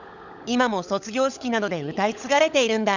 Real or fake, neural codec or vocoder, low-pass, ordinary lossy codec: fake; codec, 16 kHz, 16 kbps, FunCodec, trained on LibriTTS, 50 frames a second; 7.2 kHz; none